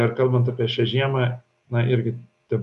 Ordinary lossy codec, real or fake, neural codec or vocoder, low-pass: MP3, 96 kbps; real; none; 10.8 kHz